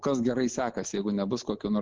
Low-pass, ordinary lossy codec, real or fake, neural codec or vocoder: 7.2 kHz; Opus, 16 kbps; real; none